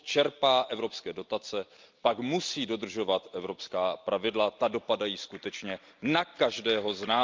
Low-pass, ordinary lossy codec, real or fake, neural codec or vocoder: 7.2 kHz; Opus, 24 kbps; real; none